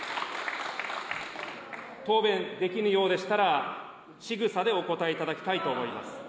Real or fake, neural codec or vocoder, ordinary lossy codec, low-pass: real; none; none; none